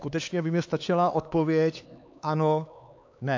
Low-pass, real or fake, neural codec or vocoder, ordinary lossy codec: 7.2 kHz; fake; codec, 16 kHz, 4 kbps, X-Codec, HuBERT features, trained on LibriSpeech; AAC, 48 kbps